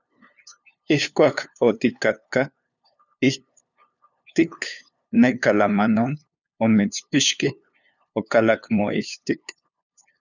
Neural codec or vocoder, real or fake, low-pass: codec, 16 kHz, 2 kbps, FunCodec, trained on LibriTTS, 25 frames a second; fake; 7.2 kHz